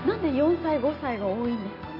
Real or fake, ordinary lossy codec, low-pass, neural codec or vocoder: real; AAC, 48 kbps; 5.4 kHz; none